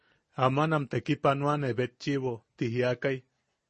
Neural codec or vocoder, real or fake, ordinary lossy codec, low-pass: none; real; MP3, 32 kbps; 10.8 kHz